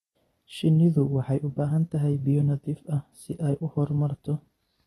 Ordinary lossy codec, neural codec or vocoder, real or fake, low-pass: AAC, 32 kbps; none; real; 19.8 kHz